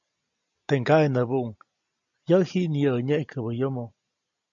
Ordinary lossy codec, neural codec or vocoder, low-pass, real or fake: MP3, 64 kbps; none; 7.2 kHz; real